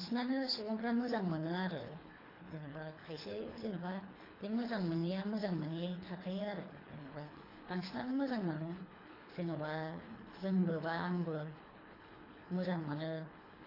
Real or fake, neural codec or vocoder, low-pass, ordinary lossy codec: fake; codec, 24 kHz, 3 kbps, HILCodec; 5.4 kHz; AAC, 24 kbps